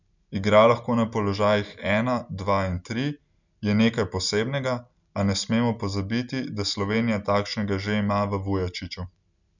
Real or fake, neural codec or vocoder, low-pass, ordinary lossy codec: real; none; 7.2 kHz; none